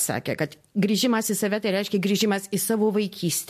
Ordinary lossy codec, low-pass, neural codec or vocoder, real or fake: MP3, 64 kbps; 14.4 kHz; none; real